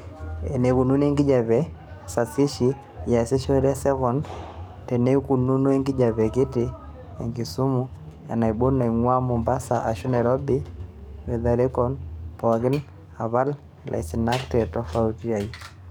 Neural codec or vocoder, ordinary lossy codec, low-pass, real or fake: codec, 44.1 kHz, 7.8 kbps, DAC; none; none; fake